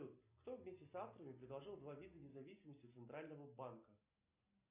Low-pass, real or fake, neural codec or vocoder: 3.6 kHz; real; none